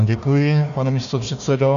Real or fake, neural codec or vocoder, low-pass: fake; codec, 16 kHz, 1 kbps, FunCodec, trained on Chinese and English, 50 frames a second; 7.2 kHz